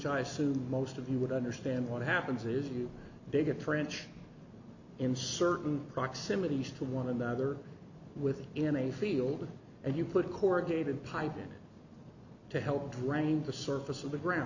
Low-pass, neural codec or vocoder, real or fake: 7.2 kHz; none; real